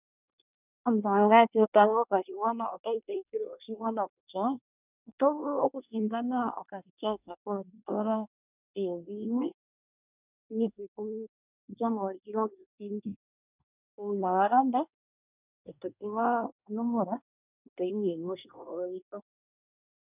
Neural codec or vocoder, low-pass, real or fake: codec, 24 kHz, 1 kbps, SNAC; 3.6 kHz; fake